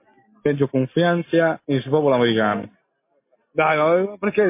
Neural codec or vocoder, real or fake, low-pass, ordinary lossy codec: none; real; 3.6 kHz; MP3, 24 kbps